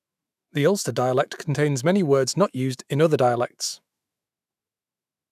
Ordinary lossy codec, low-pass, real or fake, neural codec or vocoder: none; 14.4 kHz; fake; autoencoder, 48 kHz, 128 numbers a frame, DAC-VAE, trained on Japanese speech